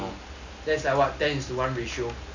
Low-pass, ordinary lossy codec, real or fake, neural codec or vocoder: 7.2 kHz; none; real; none